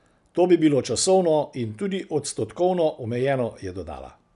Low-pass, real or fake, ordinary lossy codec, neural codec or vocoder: 10.8 kHz; real; none; none